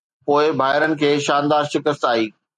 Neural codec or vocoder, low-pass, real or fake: none; 10.8 kHz; real